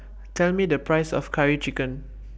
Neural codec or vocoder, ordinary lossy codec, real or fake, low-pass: none; none; real; none